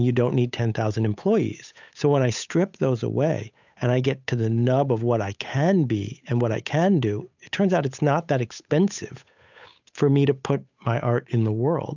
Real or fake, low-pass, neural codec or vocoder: fake; 7.2 kHz; codec, 16 kHz, 8 kbps, FunCodec, trained on Chinese and English, 25 frames a second